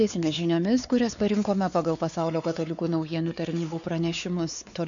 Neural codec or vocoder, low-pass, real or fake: codec, 16 kHz, 4 kbps, X-Codec, WavLM features, trained on Multilingual LibriSpeech; 7.2 kHz; fake